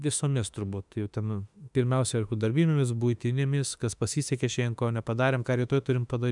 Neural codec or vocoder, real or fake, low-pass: autoencoder, 48 kHz, 32 numbers a frame, DAC-VAE, trained on Japanese speech; fake; 10.8 kHz